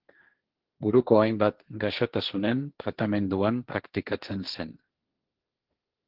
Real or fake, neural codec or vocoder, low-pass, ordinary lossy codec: fake; codec, 16 kHz, 1.1 kbps, Voila-Tokenizer; 5.4 kHz; Opus, 16 kbps